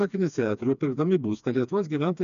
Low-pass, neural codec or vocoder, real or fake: 7.2 kHz; codec, 16 kHz, 2 kbps, FreqCodec, smaller model; fake